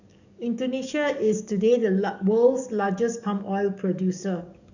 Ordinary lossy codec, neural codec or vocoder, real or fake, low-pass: none; codec, 44.1 kHz, 7.8 kbps, DAC; fake; 7.2 kHz